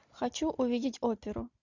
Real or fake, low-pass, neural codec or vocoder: real; 7.2 kHz; none